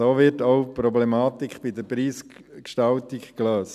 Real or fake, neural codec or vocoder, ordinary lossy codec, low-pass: real; none; none; 14.4 kHz